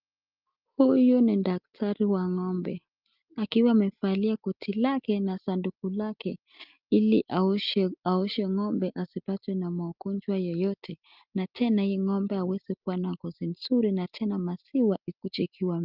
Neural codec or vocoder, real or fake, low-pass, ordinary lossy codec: none; real; 5.4 kHz; Opus, 24 kbps